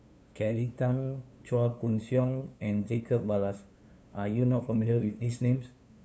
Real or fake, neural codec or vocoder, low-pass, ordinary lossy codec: fake; codec, 16 kHz, 2 kbps, FunCodec, trained on LibriTTS, 25 frames a second; none; none